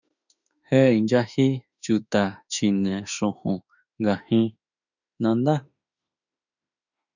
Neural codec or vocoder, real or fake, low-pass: autoencoder, 48 kHz, 32 numbers a frame, DAC-VAE, trained on Japanese speech; fake; 7.2 kHz